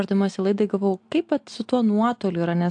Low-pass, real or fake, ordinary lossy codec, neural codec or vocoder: 9.9 kHz; real; AAC, 64 kbps; none